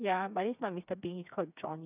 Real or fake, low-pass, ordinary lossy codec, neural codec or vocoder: fake; 3.6 kHz; none; codec, 16 kHz, 4 kbps, FreqCodec, smaller model